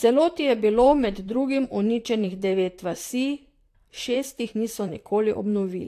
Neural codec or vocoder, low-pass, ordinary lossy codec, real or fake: vocoder, 44.1 kHz, 128 mel bands, Pupu-Vocoder; 14.4 kHz; AAC, 64 kbps; fake